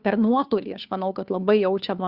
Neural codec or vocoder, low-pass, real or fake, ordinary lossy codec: codec, 16 kHz, 2 kbps, FunCodec, trained on Chinese and English, 25 frames a second; 5.4 kHz; fake; Opus, 64 kbps